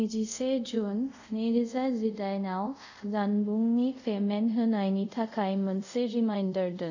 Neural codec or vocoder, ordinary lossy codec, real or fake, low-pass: codec, 24 kHz, 0.5 kbps, DualCodec; none; fake; 7.2 kHz